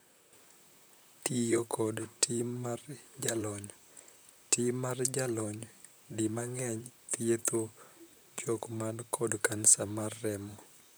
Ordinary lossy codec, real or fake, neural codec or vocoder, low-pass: none; fake; vocoder, 44.1 kHz, 128 mel bands, Pupu-Vocoder; none